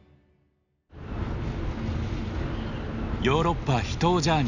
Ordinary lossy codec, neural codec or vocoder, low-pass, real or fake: none; none; 7.2 kHz; real